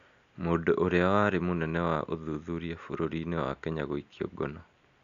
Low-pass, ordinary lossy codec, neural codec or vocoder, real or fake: 7.2 kHz; none; none; real